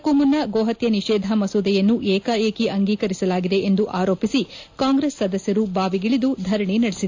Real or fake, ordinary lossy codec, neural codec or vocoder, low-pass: real; AAC, 48 kbps; none; 7.2 kHz